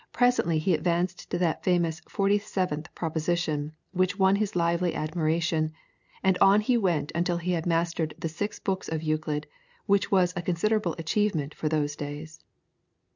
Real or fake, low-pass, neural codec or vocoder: real; 7.2 kHz; none